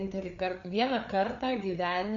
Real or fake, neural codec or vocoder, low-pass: fake; codec, 16 kHz, 4 kbps, FreqCodec, larger model; 7.2 kHz